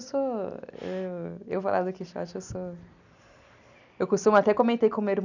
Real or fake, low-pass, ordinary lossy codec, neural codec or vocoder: real; 7.2 kHz; none; none